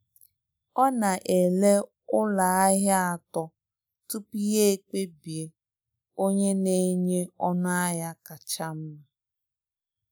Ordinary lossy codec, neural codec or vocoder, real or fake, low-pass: none; none; real; none